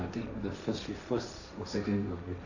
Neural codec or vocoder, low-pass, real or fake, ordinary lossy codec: codec, 16 kHz, 1.1 kbps, Voila-Tokenizer; 7.2 kHz; fake; none